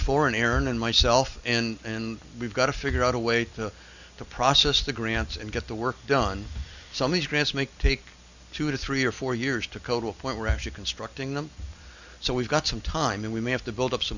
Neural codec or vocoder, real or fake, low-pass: none; real; 7.2 kHz